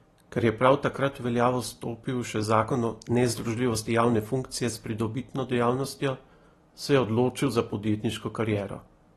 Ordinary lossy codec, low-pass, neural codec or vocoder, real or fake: AAC, 32 kbps; 19.8 kHz; none; real